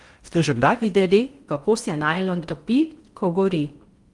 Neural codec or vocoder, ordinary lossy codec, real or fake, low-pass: codec, 16 kHz in and 24 kHz out, 0.6 kbps, FocalCodec, streaming, 4096 codes; Opus, 32 kbps; fake; 10.8 kHz